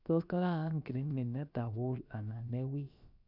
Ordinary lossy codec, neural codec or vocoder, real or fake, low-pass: none; codec, 16 kHz, about 1 kbps, DyCAST, with the encoder's durations; fake; 5.4 kHz